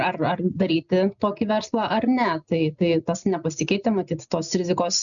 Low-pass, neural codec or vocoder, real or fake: 7.2 kHz; none; real